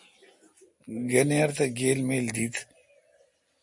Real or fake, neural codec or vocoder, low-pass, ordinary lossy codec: real; none; 10.8 kHz; MP3, 48 kbps